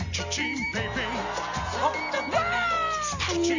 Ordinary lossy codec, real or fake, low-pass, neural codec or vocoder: Opus, 64 kbps; real; 7.2 kHz; none